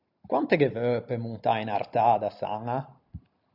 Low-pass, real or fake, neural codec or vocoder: 5.4 kHz; real; none